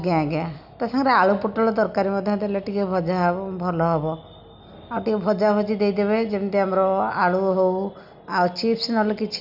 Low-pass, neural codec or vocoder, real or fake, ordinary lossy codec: 5.4 kHz; none; real; none